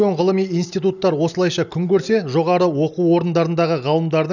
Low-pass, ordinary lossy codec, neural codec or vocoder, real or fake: 7.2 kHz; none; none; real